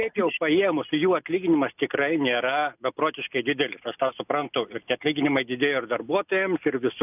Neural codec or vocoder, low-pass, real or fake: none; 3.6 kHz; real